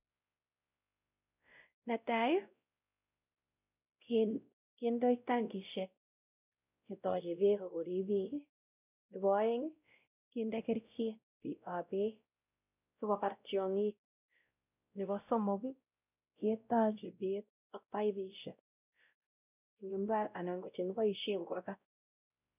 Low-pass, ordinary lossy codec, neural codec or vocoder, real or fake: 3.6 kHz; none; codec, 16 kHz, 0.5 kbps, X-Codec, WavLM features, trained on Multilingual LibriSpeech; fake